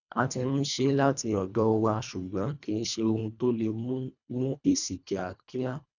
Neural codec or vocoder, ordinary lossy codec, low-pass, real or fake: codec, 24 kHz, 1.5 kbps, HILCodec; none; 7.2 kHz; fake